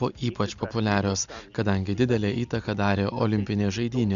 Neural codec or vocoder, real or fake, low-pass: none; real; 7.2 kHz